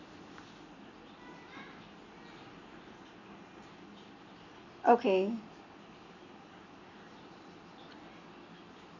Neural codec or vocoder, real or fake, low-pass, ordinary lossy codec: none; real; 7.2 kHz; none